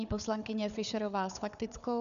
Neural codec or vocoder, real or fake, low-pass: codec, 16 kHz, 4 kbps, FunCodec, trained on Chinese and English, 50 frames a second; fake; 7.2 kHz